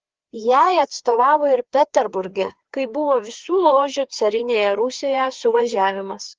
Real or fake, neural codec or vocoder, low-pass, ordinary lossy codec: fake; codec, 16 kHz, 2 kbps, FreqCodec, larger model; 7.2 kHz; Opus, 16 kbps